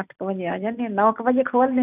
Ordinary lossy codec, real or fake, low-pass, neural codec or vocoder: none; real; 3.6 kHz; none